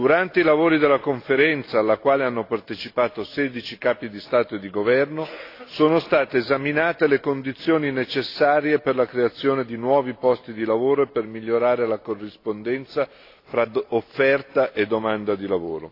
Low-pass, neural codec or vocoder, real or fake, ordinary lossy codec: 5.4 kHz; none; real; AAC, 32 kbps